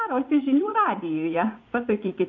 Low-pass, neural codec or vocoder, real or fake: 7.2 kHz; vocoder, 44.1 kHz, 80 mel bands, Vocos; fake